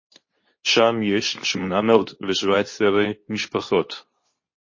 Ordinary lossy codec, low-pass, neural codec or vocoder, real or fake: MP3, 32 kbps; 7.2 kHz; codec, 24 kHz, 0.9 kbps, WavTokenizer, medium speech release version 2; fake